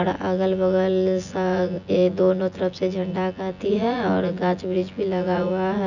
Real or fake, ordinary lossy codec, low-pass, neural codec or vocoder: fake; none; 7.2 kHz; vocoder, 24 kHz, 100 mel bands, Vocos